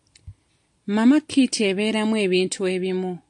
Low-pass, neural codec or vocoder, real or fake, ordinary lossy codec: 10.8 kHz; none; real; AAC, 64 kbps